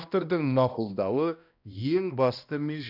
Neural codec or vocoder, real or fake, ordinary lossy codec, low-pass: codec, 16 kHz, 1 kbps, X-Codec, HuBERT features, trained on balanced general audio; fake; none; 5.4 kHz